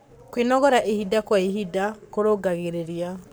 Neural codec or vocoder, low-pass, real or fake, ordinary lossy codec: codec, 44.1 kHz, 7.8 kbps, Pupu-Codec; none; fake; none